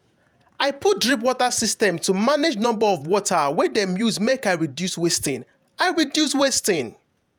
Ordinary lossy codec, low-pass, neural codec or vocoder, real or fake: none; none; vocoder, 48 kHz, 128 mel bands, Vocos; fake